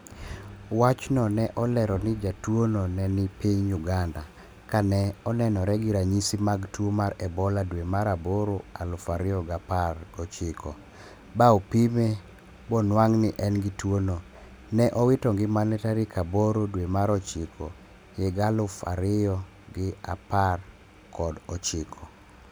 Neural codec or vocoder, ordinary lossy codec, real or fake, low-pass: none; none; real; none